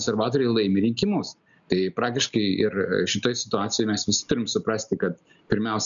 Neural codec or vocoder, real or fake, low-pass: none; real; 7.2 kHz